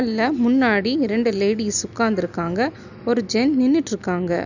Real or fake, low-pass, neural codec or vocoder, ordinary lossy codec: real; 7.2 kHz; none; none